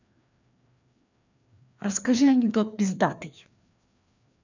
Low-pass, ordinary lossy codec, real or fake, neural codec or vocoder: 7.2 kHz; none; fake; codec, 16 kHz, 2 kbps, FreqCodec, larger model